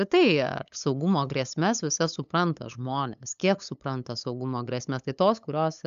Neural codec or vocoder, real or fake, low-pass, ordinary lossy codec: codec, 16 kHz, 8 kbps, FunCodec, trained on LibriTTS, 25 frames a second; fake; 7.2 kHz; AAC, 96 kbps